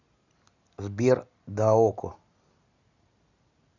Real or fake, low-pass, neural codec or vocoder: real; 7.2 kHz; none